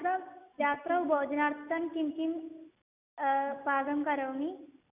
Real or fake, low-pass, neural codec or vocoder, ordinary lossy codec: real; 3.6 kHz; none; none